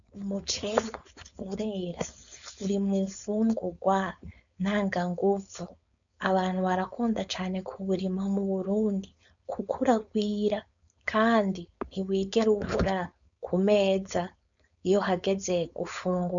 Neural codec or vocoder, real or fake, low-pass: codec, 16 kHz, 4.8 kbps, FACodec; fake; 7.2 kHz